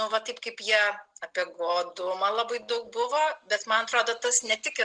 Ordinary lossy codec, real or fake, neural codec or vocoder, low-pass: Opus, 24 kbps; real; none; 9.9 kHz